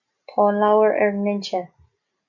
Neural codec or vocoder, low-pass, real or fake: none; 7.2 kHz; real